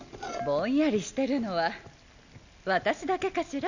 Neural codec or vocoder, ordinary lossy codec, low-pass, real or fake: none; none; 7.2 kHz; real